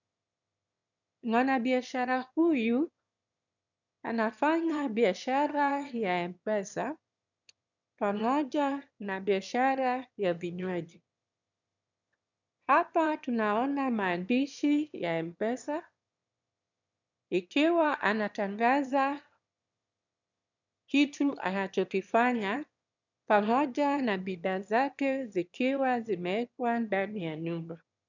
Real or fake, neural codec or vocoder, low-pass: fake; autoencoder, 22.05 kHz, a latent of 192 numbers a frame, VITS, trained on one speaker; 7.2 kHz